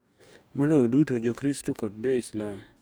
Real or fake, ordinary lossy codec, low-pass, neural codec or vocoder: fake; none; none; codec, 44.1 kHz, 2.6 kbps, DAC